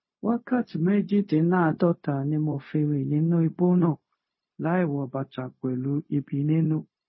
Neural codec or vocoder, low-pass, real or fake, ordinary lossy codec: codec, 16 kHz, 0.4 kbps, LongCat-Audio-Codec; 7.2 kHz; fake; MP3, 24 kbps